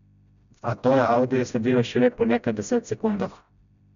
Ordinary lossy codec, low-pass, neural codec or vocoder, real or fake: none; 7.2 kHz; codec, 16 kHz, 0.5 kbps, FreqCodec, smaller model; fake